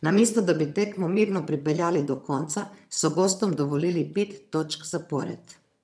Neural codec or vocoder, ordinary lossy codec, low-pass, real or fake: vocoder, 22.05 kHz, 80 mel bands, HiFi-GAN; none; none; fake